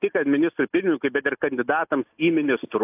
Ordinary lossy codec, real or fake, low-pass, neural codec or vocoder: AAC, 32 kbps; real; 3.6 kHz; none